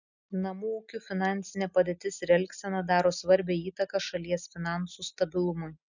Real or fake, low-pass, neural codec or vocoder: real; 7.2 kHz; none